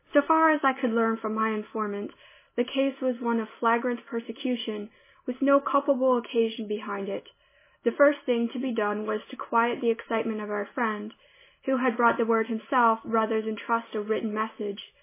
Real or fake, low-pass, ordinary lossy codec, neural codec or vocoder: real; 3.6 kHz; MP3, 16 kbps; none